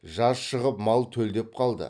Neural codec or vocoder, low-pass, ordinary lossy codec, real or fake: none; 9.9 kHz; none; real